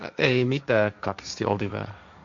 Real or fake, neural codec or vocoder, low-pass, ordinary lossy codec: fake; codec, 16 kHz, 1.1 kbps, Voila-Tokenizer; 7.2 kHz; AAC, 64 kbps